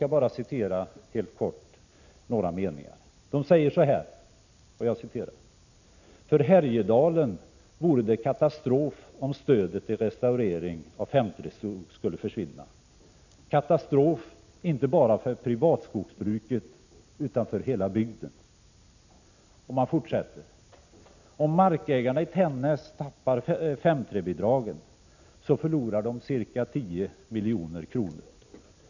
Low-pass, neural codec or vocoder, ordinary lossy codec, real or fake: 7.2 kHz; none; none; real